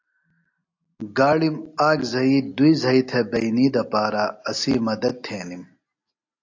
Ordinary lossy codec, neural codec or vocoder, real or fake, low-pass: AAC, 48 kbps; none; real; 7.2 kHz